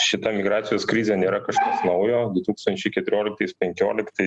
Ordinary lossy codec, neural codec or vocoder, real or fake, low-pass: Opus, 64 kbps; none; real; 10.8 kHz